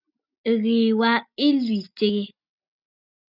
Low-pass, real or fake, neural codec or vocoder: 5.4 kHz; real; none